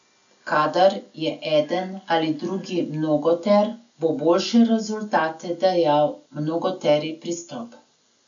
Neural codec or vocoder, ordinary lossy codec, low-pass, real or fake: none; none; 7.2 kHz; real